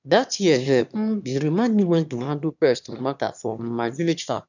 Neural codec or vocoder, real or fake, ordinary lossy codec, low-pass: autoencoder, 22.05 kHz, a latent of 192 numbers a frame, VITS, trained on one speaker; fake; none; 7.2 kHz